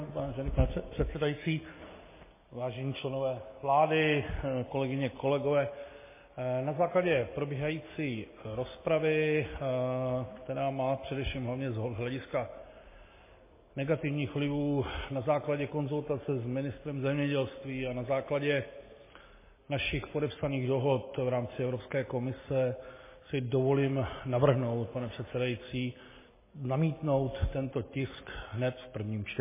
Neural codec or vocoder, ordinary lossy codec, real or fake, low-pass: none; MP3, 16 kbps; real; 3.6 kHz